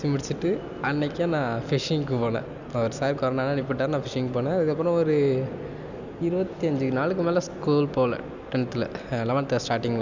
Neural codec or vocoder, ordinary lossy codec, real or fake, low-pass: none; none; real; 7.2 kHz